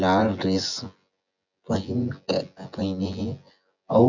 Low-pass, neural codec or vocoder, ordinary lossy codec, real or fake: 7.2 kHz; vocoder, 24 kHz, 100 mel bands, Vocos; none; fake